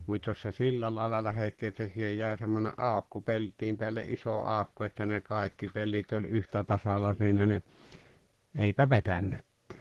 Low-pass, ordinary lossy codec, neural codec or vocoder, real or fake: 14.4 kHz; Opus, 16 kbps; codec, 44.1 kHz, 3.4 kbps, Pupu-Codec; fake